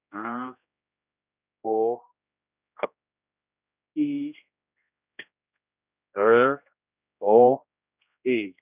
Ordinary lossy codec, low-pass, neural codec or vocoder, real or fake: none; 3.6 kHz; codec, 16 kHz, 1 kbps, X-Codec, HuBERT features, trained on general audio; fake